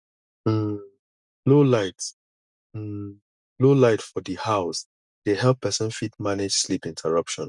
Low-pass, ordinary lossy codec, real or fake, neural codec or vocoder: 10.8 kHz; none; real; none